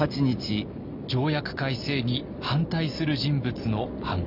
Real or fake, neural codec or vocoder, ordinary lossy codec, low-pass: real; none; none; 5.4 kHz